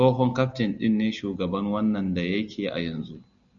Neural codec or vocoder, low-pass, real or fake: none; 7.2 kHz; real